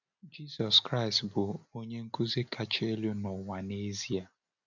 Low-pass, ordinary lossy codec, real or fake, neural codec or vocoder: 7.2 kHz; none; real; none